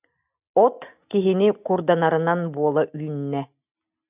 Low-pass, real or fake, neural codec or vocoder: 3.6 kHz; real; none